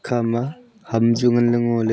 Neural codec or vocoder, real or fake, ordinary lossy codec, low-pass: none; real; none; none